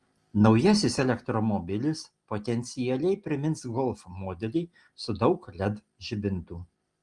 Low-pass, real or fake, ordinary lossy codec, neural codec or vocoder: 10.8 kHz; real; Opus, 24 kbps; none